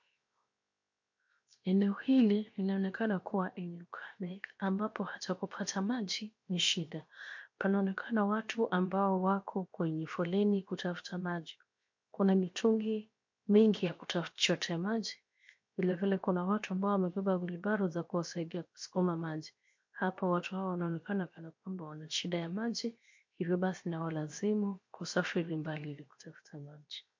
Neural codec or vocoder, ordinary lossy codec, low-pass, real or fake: codec, 16 kHz, 0.7 kbps, FocalCodec; MP3, 48 kbps; 7.2 kHz; fake